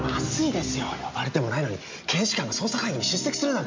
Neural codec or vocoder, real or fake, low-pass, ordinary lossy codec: none; real; 7.2 kHz; MP3, 48 kbps